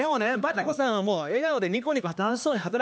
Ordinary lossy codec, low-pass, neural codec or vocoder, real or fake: none; none; codec, 16 kHz, 2 kbps, X-Codec, HuBERT features, trained on LibriSpeech; fake